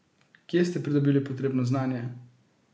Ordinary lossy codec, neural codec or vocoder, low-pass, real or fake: none; none; none; real